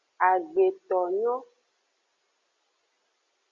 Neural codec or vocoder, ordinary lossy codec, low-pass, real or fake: none; Opus, 64 kbps; 7.2 kHz; real